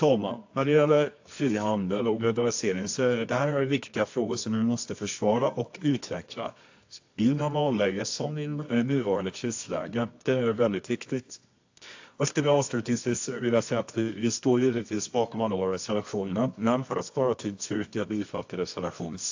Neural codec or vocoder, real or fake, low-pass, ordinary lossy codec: codec, 24 kHz, 0.9 kbps, WavTokenizer, medium music audio release; fake; 7.2 kHz; AAC, 48 kbps